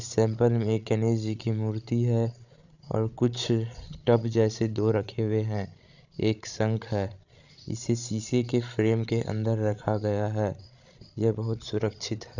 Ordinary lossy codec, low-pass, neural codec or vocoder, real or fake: none; 7.2 kHz; codec, 16 kHz, 16 kbps, FreqCodec, larger model; fake